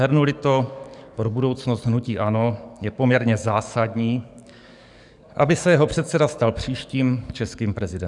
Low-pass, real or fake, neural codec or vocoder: 10.8 kHz; fake; codec, 44.1 kHz, 7.8 kbps, DAC